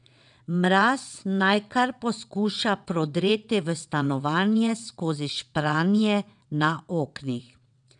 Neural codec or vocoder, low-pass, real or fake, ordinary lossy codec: vocoder, 22.05 kHz, 80 mel bands, WaveNeXt; 9.9 kHz; fake; none